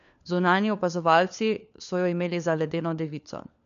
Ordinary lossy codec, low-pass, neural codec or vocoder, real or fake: none; 7.2 kHz; codec, 16 kHz, 4 kbps, FunCodec, trained on LibriTTS, 50 frames a second; fake